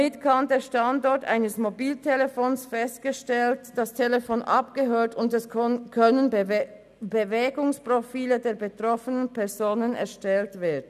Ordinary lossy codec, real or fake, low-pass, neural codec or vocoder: none; real; 14.4 kHz; none